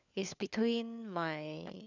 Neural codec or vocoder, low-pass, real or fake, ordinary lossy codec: codec, 16 kHz, 4 kbps, FunCodec, trained on LibriTTS, 50 frames a second; 7.2 kHz; fake; none